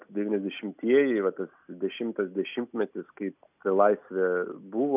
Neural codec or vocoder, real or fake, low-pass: none; real; 3.6 kHz